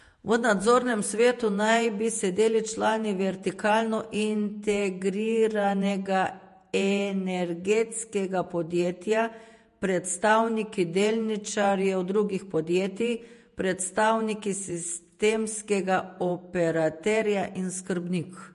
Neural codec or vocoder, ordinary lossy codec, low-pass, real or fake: vocoder, 48 kHz, 128 mel bands, Vocos; MP3, 48 kbps; 14.4 kHz; fake